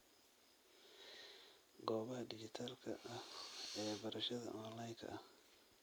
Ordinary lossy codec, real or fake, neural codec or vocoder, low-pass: none; real; none; none